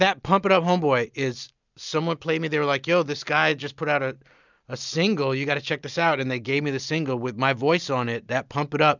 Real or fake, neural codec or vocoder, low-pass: real; none; 7.2 kHz